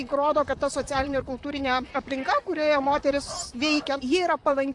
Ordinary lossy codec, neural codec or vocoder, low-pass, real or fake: AAC, 64 kbps; vocoder, 44.1 kHz, 128 mel bands, Pupu-Vocoder; 10.8 kHz; fake